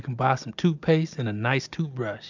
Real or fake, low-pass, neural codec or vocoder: real; 7.2 kHz; none